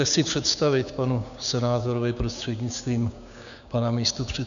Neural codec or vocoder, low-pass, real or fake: codec, 16 kHz, 6 kbps, DAC; 7.2 kHz; fake